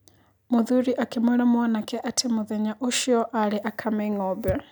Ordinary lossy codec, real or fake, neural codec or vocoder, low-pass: none; real; none; none